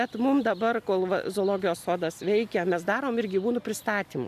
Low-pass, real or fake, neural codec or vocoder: 14.4 kHz; real; none